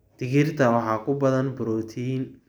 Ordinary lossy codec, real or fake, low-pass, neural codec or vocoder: none; real; none; none